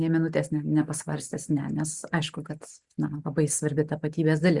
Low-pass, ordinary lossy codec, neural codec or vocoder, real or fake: 10.8 kHz; Opus, 64 kbps; none; real